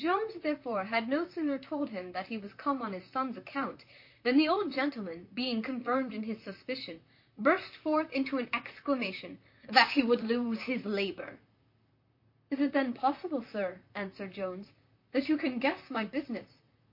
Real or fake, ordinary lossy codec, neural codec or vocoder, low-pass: fake; MP3, 32 kbps; vocoder, 44.1 kHz, 128 mel bands, Pupu-Vocoder; 5.4 kHz